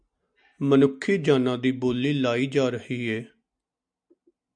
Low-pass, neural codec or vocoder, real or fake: 9.9 kHz; none; real